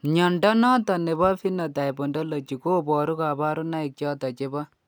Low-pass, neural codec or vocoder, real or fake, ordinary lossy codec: none; none; real; none